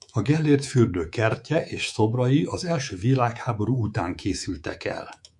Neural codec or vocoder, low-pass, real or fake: codec, 24 kHz, 3.1 kbps, DualCodec; 10.8 kHz; fake